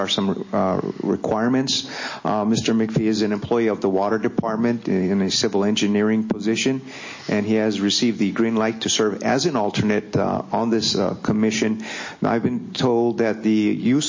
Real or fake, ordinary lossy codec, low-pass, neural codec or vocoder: real; MP3, 32 kbps; 7.2 kHz; none